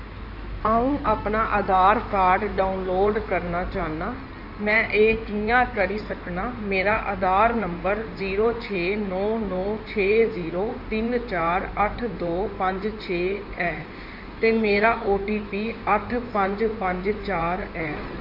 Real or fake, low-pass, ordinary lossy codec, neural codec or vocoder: fake; 5.4 kHz; none; codec, 16 kHz in and 24 kHz out, 2.2 kbps, FireRedTTS-2 codec